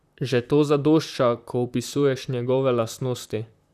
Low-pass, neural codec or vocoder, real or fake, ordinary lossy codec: 14.4 kHz; vocoder, 44.1 kHz, 128 mel bands, Pupu-Vocoder; fake; none